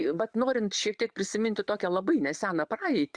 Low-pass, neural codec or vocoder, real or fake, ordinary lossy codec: 9.9 kHz; vocoder, 22.05 kHz, 80 mel bands, Vocos; fake; Opus, 64 kbps